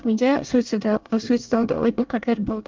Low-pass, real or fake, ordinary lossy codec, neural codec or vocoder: 7.2 kHz; fake; Opus, 24 kbps; codec, 24 kHz, 1 kbps, SNAC